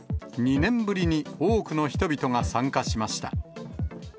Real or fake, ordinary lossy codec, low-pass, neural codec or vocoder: real; none; none; none